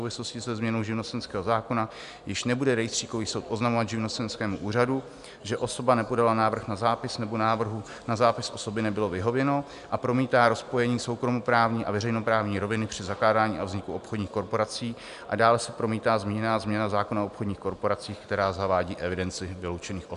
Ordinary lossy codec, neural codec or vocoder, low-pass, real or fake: MP3, 96 kbps; none; 10.8 kHz; real